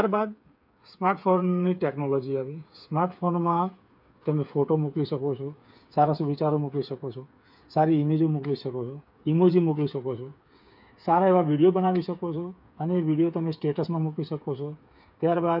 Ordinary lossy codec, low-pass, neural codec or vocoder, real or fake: none; 5.4 kHz; codec, 16 kHz, 8 kbps, FreqCodec, smaller model; fake